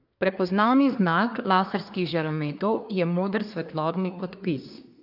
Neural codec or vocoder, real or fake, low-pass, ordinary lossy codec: codec, 24 kHz, 1 kbps, SNAC; fake; 5.4 kHz; AAC, 48 kbps